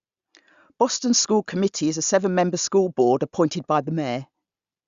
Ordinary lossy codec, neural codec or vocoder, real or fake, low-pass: Opus, 64 kbps; none; real; 7.2 kHz